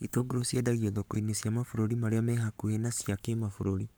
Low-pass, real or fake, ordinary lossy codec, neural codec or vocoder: none; fake; none; codec, 44.1 kHz, 7.8 kbps, Pupu-Codec